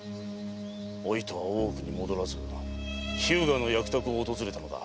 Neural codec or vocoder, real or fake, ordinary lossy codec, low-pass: none; real; none; none